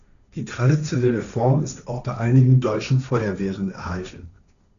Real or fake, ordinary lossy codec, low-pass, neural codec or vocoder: fake; MP3, 96 kbps; 7.2 kHz; codec, 16 kHz, 1.1 kbps, Voila-Tokenizer